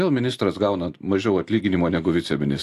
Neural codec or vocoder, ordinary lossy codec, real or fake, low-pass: none; AAC, 64 kbps; real; 14.4 kHz